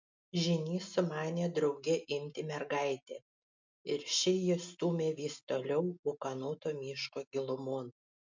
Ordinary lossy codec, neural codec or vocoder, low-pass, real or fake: MP3, 64 kbps; none; 7.2 kHz; real